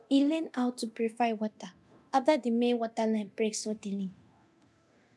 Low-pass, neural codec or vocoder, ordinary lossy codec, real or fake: none; codec, 24 kHz, 0.9 kbps, DualCodec; none; fake